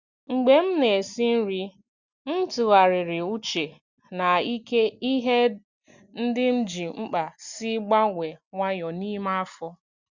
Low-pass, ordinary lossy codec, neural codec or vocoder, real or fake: 7.2 kHz; Opus, 64 kbps; none; real